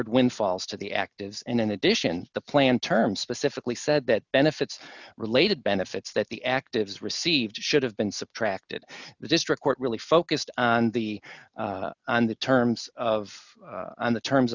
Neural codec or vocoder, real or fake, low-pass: none; real; 7.2 kHz